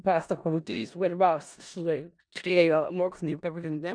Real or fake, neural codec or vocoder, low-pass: fake; codec, 16 kHz in and 24 kHz out, 0.4 kbps, LongCat-Audio-Codec, four codebook decoder; 9.9 kHz